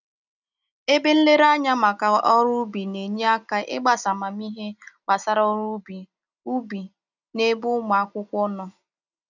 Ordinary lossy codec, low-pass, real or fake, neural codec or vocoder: none; 7.2 kHz; real; none